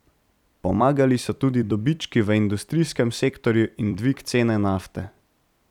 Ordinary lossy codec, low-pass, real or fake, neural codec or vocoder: none; 19.8 kHz; fake; vocoder, 44.1 kHz, 128 mel bands every 256 samples, BigVGAN v2